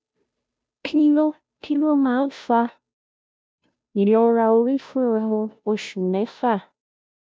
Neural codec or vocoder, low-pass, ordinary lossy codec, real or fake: codec, 16 kHz, 0.5 kbps, FunCodec, trained on Chinese and English, 25 frames a second; none; none; fake